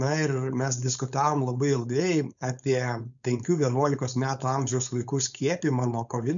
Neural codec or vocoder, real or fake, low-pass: codec, 16 kHz, 4.8 kbps, FACodec; fake; 7.2 kHz